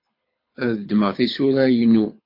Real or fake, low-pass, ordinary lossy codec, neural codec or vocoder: fake; 5.4 kHz; MP3, 32 kbps; codec, 24 kHz, 6 kbps, HILCodec